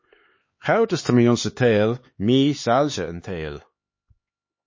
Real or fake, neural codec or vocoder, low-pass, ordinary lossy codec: fake; codec, 16 kHz, 4 kbps, X-Codec, HuBERT features, trained on LibriSpeech; 7.2 kHz; MP3, 32 kbps